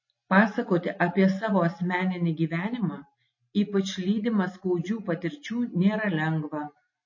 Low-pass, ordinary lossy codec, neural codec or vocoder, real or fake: 7.2 kHz; MP3, 32 kbps; none; real